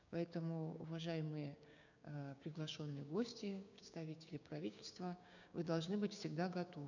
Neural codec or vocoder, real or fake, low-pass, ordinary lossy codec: codec, 16 kHz, 6 kbps, DAC; fake; 7.2 kHz; none